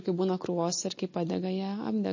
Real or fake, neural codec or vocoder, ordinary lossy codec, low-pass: real; none; MP3, 32 kbps; 7.2 kHz